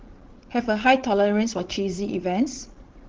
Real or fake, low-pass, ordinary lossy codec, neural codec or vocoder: fake; 7.2 kHz; Opus, 16 kbps; codec, 16 kHz, 16 kbps, FreqCodec, larger model